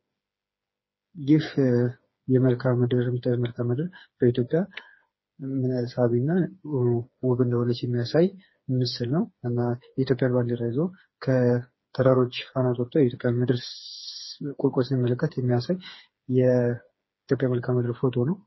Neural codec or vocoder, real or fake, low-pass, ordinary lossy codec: codec, 16 kHz, 4 kbps, FreqCodec, smaller model; fake; 7.2 kHz; MP3, 24 kbps